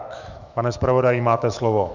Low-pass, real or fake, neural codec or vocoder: 7.2 kHz; fake; autoencoder, 48 kHz, 128 numbers a frame, DAC-VAE, trained on Japanese speech